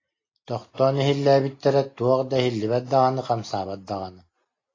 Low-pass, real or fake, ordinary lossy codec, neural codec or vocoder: 7.2 kHz; real; AAC, 32 kbps; none